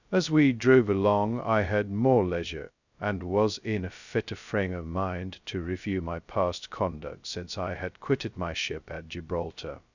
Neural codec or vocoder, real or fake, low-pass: codec, 16 kHz, 0.2 kbps, FocalCodec; fake; 7.2 kHz